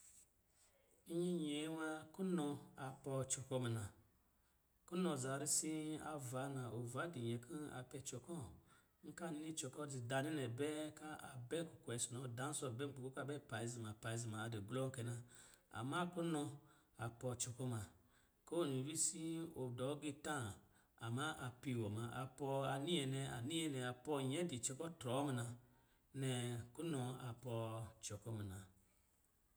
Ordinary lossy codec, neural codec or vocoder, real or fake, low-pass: none; none; real; none